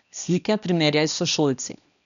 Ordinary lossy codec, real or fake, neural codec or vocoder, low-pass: MP3, 96 kbps; fake; codec, 16 kHz, 1 kbps, X-Codec, HuBERT features, trained on balanced general audio; 7.2 kHz